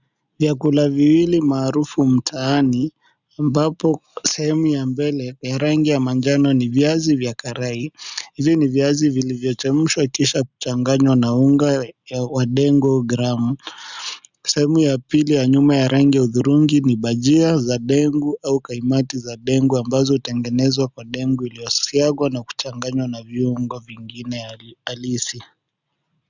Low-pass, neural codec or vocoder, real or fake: 7.2 kHz; none; real